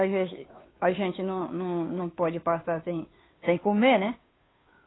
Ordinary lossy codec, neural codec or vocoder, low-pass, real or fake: AAC, 16 kbps; codec, 16 kHz, 2 kbps, FunCodec, trained on Chinese and English, 25 frames a second; 7.2 kHz; fake